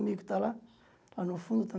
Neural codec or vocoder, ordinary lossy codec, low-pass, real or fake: none; none; none; real